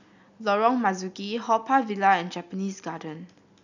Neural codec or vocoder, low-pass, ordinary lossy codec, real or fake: none; 7.2 kHz; none; real